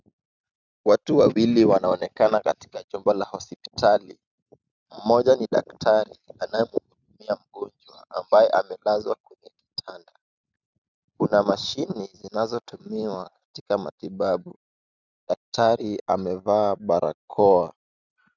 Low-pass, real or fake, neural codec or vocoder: 7.2 kHz; real; none